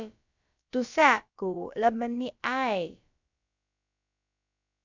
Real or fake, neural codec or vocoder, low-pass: fake; codec, 16 kHz, about 1 kbps, DyCAST, with the encoder's durations; 7.2 kHz